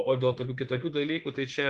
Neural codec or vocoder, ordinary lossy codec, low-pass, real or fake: autoencoder, 48 kHz, 32 numbers a frame, DAC-VAE, trained on Japanese speech; Opus, 24 kbps; 10.8 kHz; fake